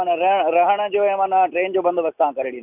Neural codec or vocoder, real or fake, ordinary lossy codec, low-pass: none; real; none; 3.6 kHz